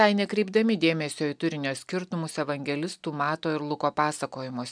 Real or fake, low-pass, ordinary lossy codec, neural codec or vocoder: real; 9.9 kHz; MP3, 96 kbps; none